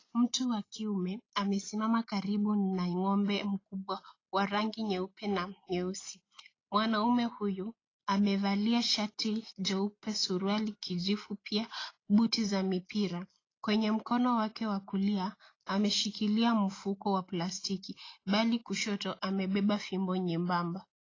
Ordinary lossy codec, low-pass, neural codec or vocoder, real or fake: AAC, 32 kbps; 7.2 kHz; none; real